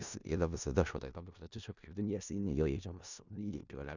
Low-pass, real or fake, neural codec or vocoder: 7.2 kHz; fake; codec, 16 kHz in and 24 kHz out, 0.4 kbps, LongCat-Audio-Codec, four codebook decoder